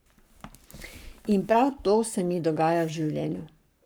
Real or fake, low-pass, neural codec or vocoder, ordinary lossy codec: fake; none; codec, 44.1 kHz, 7.8 kbps, Pupu-Codec; none